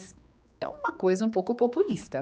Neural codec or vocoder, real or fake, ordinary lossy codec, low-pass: codec, 16 kHz, 2 kbps, X-Codec, HuBERT features, trained on general audio; fake; none; none